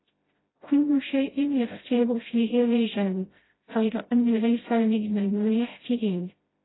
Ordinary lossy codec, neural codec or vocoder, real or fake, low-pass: AAC, 16 kbps; codec, 16 kHz, 0.5 kbps, FreqCodec, smaller model; fake; 7.2 kHz